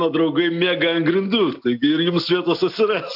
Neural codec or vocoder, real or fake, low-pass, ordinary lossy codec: none; real; 5.4 kHz; AAC, 48 kbps